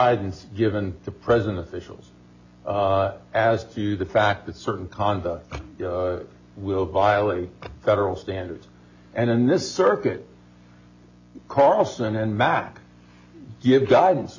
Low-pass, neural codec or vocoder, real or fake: 7.2 kHz; none; real